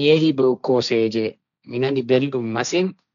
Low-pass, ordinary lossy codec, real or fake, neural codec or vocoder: 7.2 kHz; none; fake; codec, 16 kHz, 1.1 kbps, Voila-Tokenizer